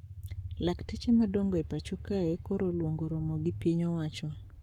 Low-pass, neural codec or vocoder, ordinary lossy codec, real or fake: 19.8 kHz; codec, 44.1 kHz, 7.8 kbps, Pupu-Codec; none; fake